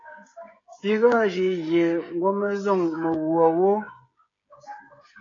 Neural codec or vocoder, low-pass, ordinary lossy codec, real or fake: codec, 16 kHz, 16 kbps, FreqCodec, smaller model; 7.2 kHz; AAC, 32 kbps; fake